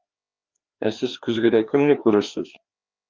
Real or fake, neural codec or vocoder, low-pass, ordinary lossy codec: fake; codec, 16 kHz, 2 kbps, FreqCodec, larger model; 7.2 kHz; Opus, 24 kbps